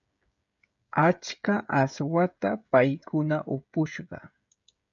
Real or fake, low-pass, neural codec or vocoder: fake; 7.2 kHz; codec, 16 kHz, 16 kbps, FreqCodec, smaller model